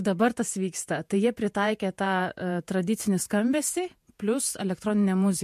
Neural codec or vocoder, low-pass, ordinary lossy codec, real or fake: vocoder, 48 kHz, 128 mel bands, Vocos; 14.4 kHz; MP3, 64 kbps; fake